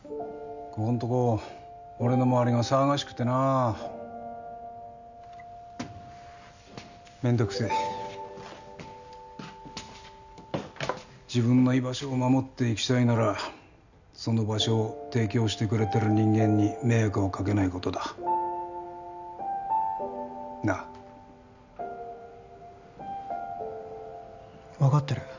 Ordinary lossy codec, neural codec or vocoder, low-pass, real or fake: none; none; 7.2 kHz; real